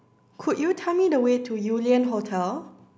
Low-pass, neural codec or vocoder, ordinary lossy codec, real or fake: none; none; none; real